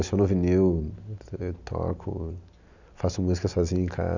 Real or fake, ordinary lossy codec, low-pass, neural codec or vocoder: real; none; 7.2 kHz; none